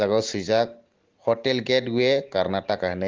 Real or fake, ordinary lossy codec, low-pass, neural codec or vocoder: real; Opus, 16 kbps; 7.2 kHz; none